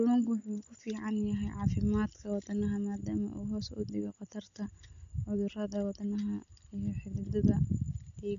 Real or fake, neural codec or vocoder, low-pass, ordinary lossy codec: real; none; 7.2 kHz; MP3, 48 kbps